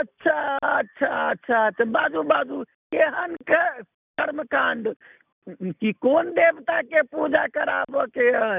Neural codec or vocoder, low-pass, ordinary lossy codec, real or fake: none; 3.6 kHz; none; real